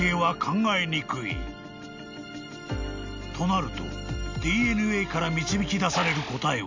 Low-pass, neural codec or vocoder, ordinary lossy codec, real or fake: 7.2 kHz; none; MP3, 64 kbps; real